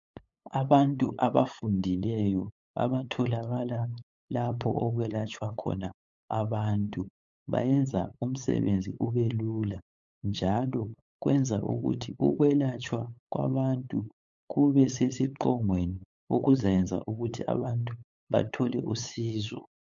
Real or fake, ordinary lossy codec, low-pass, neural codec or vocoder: fake; MP3, 64 kbps; 7.2 kHz; codec, 16 kHz, 8 kbps, FunCodec, trained on LibriTTS, 25 frames a second